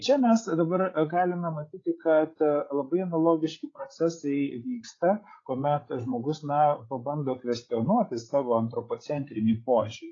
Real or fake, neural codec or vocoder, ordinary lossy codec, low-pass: fake; codec, 16 kHz, 8 kbps, FreqCodec, larger model; AAC, 32 kbps; 7.2 kHz